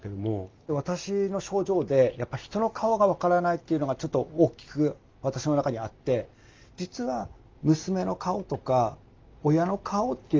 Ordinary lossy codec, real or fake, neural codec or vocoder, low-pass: Opus, 32 kbps; real; none; 7.2 kHz